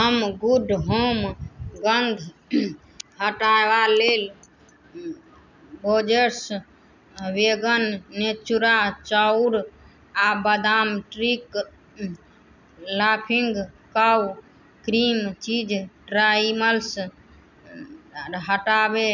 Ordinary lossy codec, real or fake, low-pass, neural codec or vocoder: none; real; 7.2 kHz; none